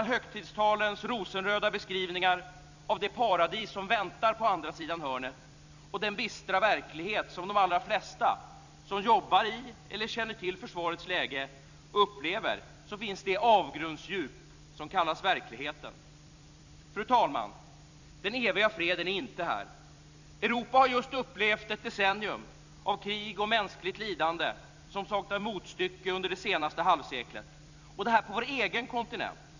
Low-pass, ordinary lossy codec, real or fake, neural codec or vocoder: 7.2 kHz; none; fake; vocoder, 44.1 kHz, 128 mel bands every 256 samples, BigVGAN v2